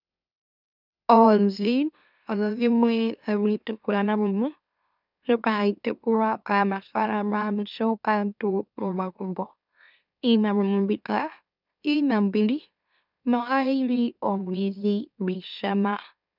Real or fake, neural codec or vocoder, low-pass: fake; autoencoder, 44.1 kHz, a latent of 192 numbers a frame, MeloTTS; 5.4 kHz